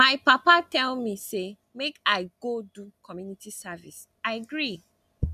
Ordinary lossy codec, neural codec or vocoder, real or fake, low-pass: none; none; real; 14.4 kHz